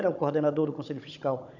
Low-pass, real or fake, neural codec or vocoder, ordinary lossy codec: 7.2 kHz; fake; codec, 16 kHz, 16 kbps, FunCodec, trained on Chinese and English, 50 frames a second; none